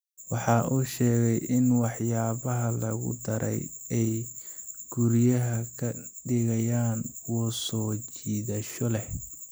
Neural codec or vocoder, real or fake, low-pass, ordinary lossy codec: none; real; none; none